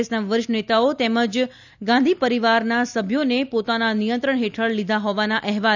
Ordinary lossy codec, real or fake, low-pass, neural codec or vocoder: none; real; 7.2 kHz; none